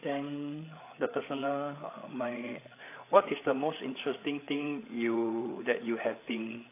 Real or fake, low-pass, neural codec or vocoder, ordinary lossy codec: fake; 3.6 kHz; codec, 16 kHz, 8 kbps, FreqCodec, larger model; AAC, 24 kbps